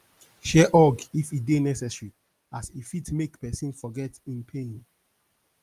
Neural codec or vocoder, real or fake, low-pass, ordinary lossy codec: none; real; 14.4 kHz; Opus, 32 kbps